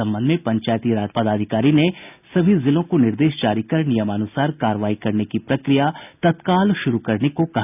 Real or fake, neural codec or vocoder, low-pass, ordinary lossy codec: real; none; 3.6 kHz; none